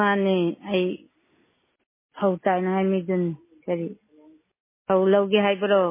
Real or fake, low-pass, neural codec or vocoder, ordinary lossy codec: real; 3.6 kHz; none; MP3, 16 kbps